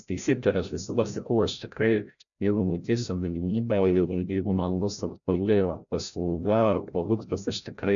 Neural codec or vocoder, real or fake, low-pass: codec, 16 kHz, 0.5 kbps, FreqCodec, larger model; fake; 7.2 kHz